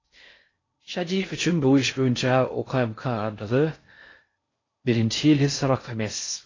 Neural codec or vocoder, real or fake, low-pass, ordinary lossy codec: codec, 16 kHz in and 24 kHz out, 0.6 kbps, FocalCodec, streaming, 4096 codes; fake; 7.2 kHz; AAC, 32 kbps